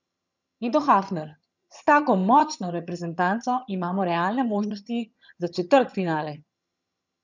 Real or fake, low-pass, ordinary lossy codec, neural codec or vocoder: fake; 7.2 kHz; none; vocoder, 22.05 kHz, 80 mel bands, HiFi-GAN